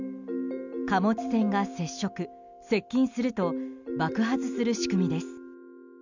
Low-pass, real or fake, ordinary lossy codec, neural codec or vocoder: 7.2 kHz; real; none; none